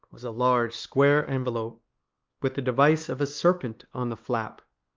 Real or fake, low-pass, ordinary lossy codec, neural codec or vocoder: fake; 7.2 kHz; Opus, 24 kbps; codec, 16 kHz, 2 kbps, X-Codec, WavLM features, trained on Multilingual LibriSpeech